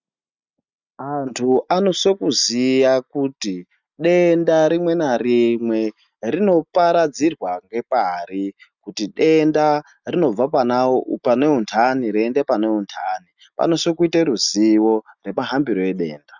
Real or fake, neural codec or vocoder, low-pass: real; none; 7.2 kHz